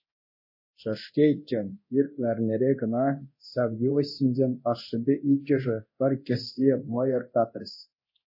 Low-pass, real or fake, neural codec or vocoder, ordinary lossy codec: 5.4 kHz; fake; codec, 24 kHz, 0.9 kbps, DualCodec; MP3, 24 kbps